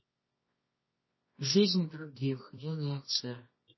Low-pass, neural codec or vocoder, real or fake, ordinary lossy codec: 7.2 kHz; codec, 24 kHz, 0.9 kbps, WavTokenizer, medium music audio release; fake; MP3, 24 kbps